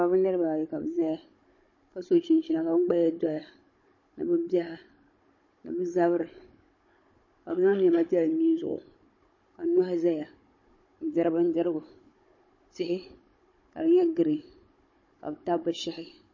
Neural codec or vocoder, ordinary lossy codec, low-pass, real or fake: codec, 16 kHz, 16 kbps, FunCodec, trained on Chinese and English, 50 frames a second; MP3, 32 kbps; 7.2 kHz; fake